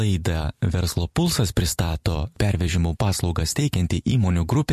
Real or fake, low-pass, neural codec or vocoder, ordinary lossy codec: fake; 14.4 kHz; vocoder, 44.1 kHz, 128 mel bands every 256 samples, BigVGAN v2; MP3, 64 kbps